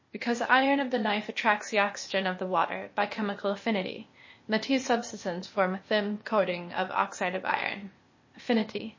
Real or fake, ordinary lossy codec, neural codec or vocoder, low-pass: fake; MP3, 32 kbps; codec, 16 kHz, 0.8 kbps, ZipCodec; 7.2 kHz